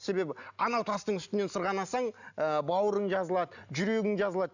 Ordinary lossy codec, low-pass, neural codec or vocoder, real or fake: none; 7.2 kHz; none; real